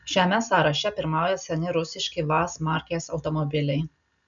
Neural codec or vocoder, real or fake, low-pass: none; real; 7.2 kHz